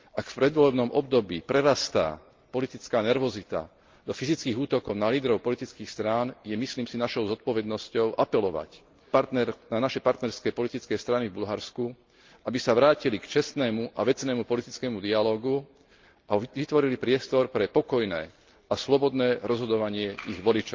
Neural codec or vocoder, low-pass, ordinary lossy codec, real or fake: none; 7.2 kHz; Opus, 32 kbps; real